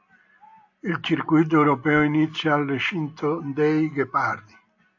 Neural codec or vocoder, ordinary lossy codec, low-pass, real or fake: none; AAC, 48 kbps; 7.2 kHz; real